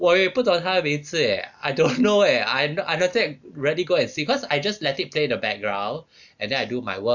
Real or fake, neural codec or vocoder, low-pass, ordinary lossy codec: real; none; 7.2 kHz; none